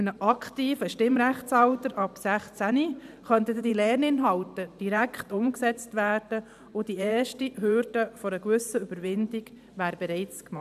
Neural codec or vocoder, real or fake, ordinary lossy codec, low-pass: vocoder, 44.1 kHz, 128 mel bands every 512 samples, BigVGAN v2; fake; none; 14.4 kHz